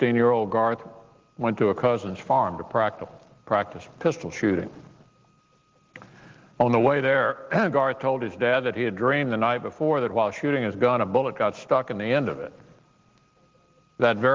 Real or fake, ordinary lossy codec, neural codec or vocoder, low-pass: real; Opus, 16 kbps; none; 7.2 kHz